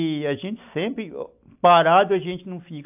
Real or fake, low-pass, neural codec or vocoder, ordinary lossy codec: real; 3.6 kHz; none; AAC, 32 kbps